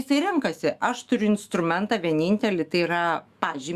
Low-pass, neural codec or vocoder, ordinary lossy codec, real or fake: 14.4 kHz; autoencoder, 48 kHz, 128 numbers a frame, DAC-VAE, trained on Japanese speech; Opus, 64 kbps; fake